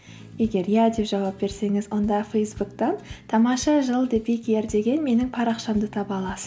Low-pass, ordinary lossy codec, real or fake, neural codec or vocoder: none; none; real; none